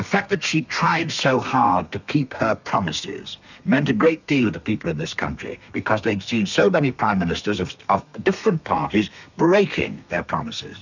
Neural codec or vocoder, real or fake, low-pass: codec, 32 kHz, 1.9 kbps, SNAC; fake; 7.2 kHz